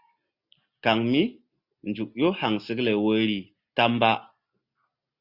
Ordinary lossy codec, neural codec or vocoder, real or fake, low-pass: Opus, 64 kbps; none; real; 5.4 kHz